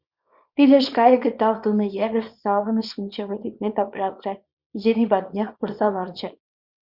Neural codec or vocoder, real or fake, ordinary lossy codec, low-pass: codec, 24 kHz, 0.9 kbps, WavTokenizer, small release; fake; Opus, 64 kbps; 5.4 kHz